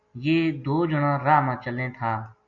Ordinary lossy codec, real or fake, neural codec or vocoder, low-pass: MP3, 48 kbps; real; none; 7.2 kHz